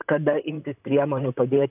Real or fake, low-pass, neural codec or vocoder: fake; 3.6 kHz; vocoder, 44.1 kHz, 128 mel bands, Pupu-Vocoder